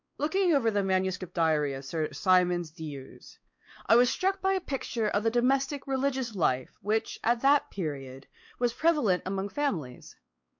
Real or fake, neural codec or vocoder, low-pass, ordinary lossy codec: fake; codec, 16 kHz, 4 kbps, X-Codec, WavLM features, trained on Multilingual LibriSpeech; 7.2 kHz; MP3, 48 kbps